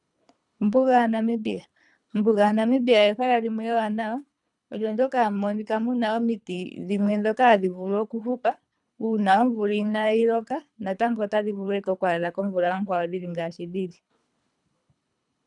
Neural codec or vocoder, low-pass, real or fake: codec, 24 kHz, 3 kbps, HILCodec; 10.8 kHz; fake